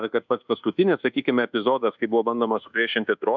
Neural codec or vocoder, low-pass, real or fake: codec, 24 kHz, 1.2 kbps, DualCodec; 7.2 kHz; fake